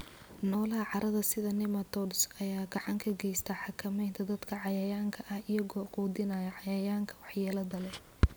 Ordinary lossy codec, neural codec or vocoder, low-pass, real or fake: none; none; none; real